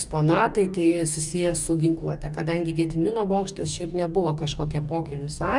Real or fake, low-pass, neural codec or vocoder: fake; 10.8 kHz; codec, 44.1 kHz, 2.6 kbps, DAC